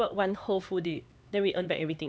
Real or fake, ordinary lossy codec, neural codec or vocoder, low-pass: fake; none; codec, 16 kHz, 4 kbps, X-Codec, HuBERT features, trained on LibriSpeech; none